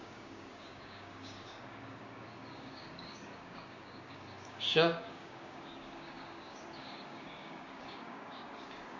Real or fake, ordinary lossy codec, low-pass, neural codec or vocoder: fake; MP3, 32 kbps; 7.2 kHz; codec, 16 kHz, 6 kbps, DAC